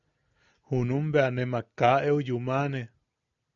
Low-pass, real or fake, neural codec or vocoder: 7.2 kHz; real; none